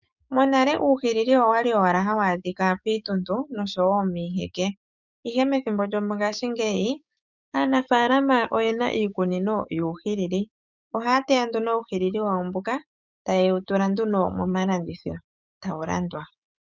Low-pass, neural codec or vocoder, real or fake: 7.2 kHz; codec, 16 kHz, 6 kbps, DAC; fake